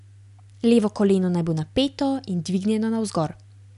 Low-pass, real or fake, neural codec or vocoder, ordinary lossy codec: 10.8 kHz; real; none; none